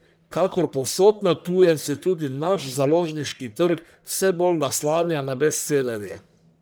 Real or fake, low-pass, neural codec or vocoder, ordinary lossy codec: fake; none; codec, 44.1 kHz, 1.7 kbps, Pupu-Codec; none